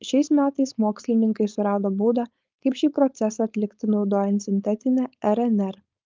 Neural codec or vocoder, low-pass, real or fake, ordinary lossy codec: codec, 16 kHz, 4.8 kbps, FACodec; 7.2 kHz; fake; Opus, 24 kbps